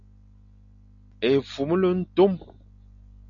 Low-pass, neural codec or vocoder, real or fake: 7.2 kHz; none; real